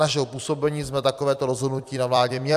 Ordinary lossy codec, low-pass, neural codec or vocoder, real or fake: AAC, 96 kbps; 14.4 kHz; vocoder, 44.1 kHz, 128 mel bands every 256 samples, BigVGAN v2; fake